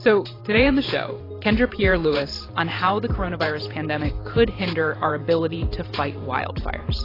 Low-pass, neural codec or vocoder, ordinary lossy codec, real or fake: 5.4 kHz; vocoder, 44.1 kHz, 128 mel bands every 512 samples, BigVGAN v2; AAC, 24 kbps; fake